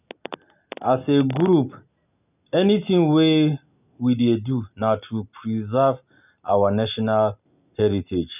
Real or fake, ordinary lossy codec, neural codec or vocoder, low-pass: real; none; none; 3.6 kHz